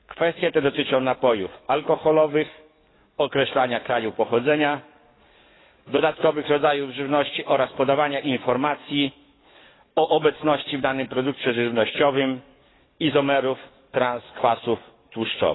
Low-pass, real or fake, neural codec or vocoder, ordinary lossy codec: 7.2 kHz; fake; codec, 44.1 kHz, 7.8 kbps, DAC; AAC, 16 kbps